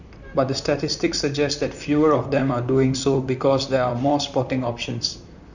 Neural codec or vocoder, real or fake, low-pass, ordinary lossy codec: vocoder, 44.1 kHz, 128 mel bands, Pupu-Vocoder; fake; 7.2 kHz; none